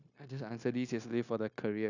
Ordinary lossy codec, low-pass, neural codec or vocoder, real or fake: none; 7.2 kHz; codec, 16 kHz, 0.9 kbps, LongCat-Audio-Codec; fake